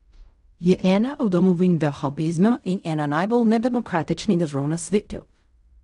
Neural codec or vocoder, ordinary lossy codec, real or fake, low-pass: codec, 16 kHz in and 24 kHz out, 0.4 kbps, LongCat-Audio-Codec, fine tuned four codebook decoder; none; fake; 10.8 kHz